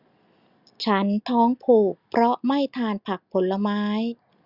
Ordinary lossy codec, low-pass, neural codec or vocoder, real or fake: Opus, 64 kbps; 5.4 kHz; none; real